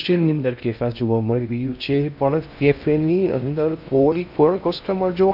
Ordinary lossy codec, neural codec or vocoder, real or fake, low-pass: none; codec, 16 kHz in and 24 kHz out, 0.6 kbps, FocalCodec, streaming, 2048 codes; fake; 5.4 kHz